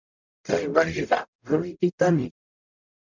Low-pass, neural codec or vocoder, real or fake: 7.2 kHz; codec, 44.1 kHz, 0.9 kbps, DAC; fake